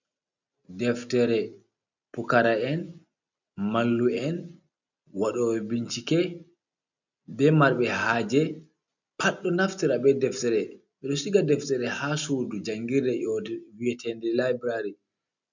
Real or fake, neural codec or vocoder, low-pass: real; none; 7.2 kHz